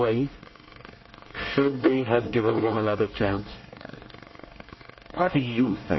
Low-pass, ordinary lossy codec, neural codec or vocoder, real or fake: 7.2 kHz; MP3, 24 kbps; codec, 24 kHz, 1 kbps, SNAC; fake